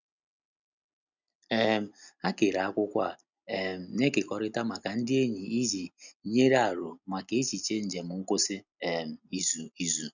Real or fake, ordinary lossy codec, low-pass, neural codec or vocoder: real; none; 7.2 kHz; none